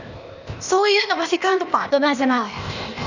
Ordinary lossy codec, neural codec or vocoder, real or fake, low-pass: none; codec, 16 kHz, 0.8 kbps, ZipCodec; fake; 7.2 kHz